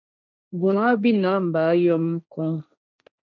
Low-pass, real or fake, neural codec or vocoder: 7.2 kHz; fake; codec, 16 kHz, 1.1 kbps, Voila-Tokenizer